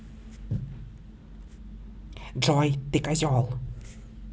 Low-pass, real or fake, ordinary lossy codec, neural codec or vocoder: none; real; none; none